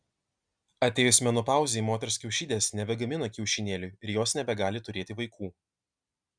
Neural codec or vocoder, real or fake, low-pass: none; real; 9.9 kHz